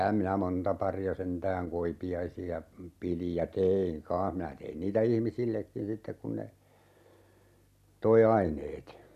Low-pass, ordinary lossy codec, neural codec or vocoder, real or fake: 14.4 kHz; none; none; real